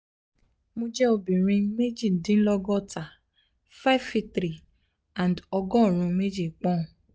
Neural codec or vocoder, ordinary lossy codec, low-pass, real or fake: none; none; none; real